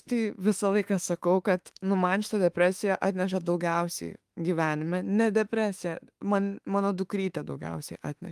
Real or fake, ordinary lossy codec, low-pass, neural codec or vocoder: fake; Opus, 32 kbps; 14.4 kHz; autoencoder, 48 kHz, 32 numbers a frame, DAC-VAE, trained on Japanese speech